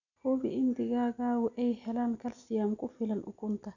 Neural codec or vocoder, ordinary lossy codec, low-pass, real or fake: none; none; 7.2 kHz; real